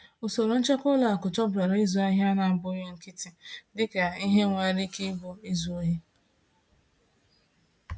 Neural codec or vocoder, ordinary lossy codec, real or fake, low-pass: none; none; real; none